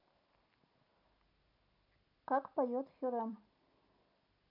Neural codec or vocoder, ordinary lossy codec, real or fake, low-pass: none; none; real; 5.4 kHz